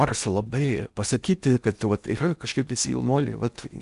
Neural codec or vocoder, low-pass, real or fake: codec, 16 kHz in and 24 kHz out, 0.6 kbps, FocalCodec, streaming, 4096 codes; 10.8 kHz; fake